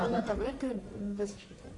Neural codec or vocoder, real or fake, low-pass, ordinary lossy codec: codec, 44.1 kHz, 1.7 kbps, Pupu-Codec; fake; 10.8 kHz; AAC, 48 kbps